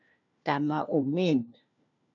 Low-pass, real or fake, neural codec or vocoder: 7.2 kHz; fake; codec, 16 kHz, 1 kbps, FunCodec, trained on LibriTTS, 50 frames a second